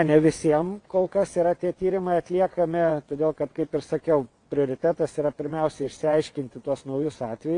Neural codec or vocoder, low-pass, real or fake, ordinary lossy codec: vocoder, 22.05 kHz, 80 mel bands, WaveNeXt; 9.9 kHz; fake; AAC, 48 kbps